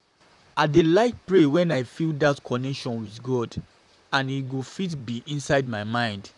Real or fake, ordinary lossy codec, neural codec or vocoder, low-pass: fake; none; vocoder, 44.1 kHz, 128 mel bands, Pupu-Vocoder; 10.8 kHz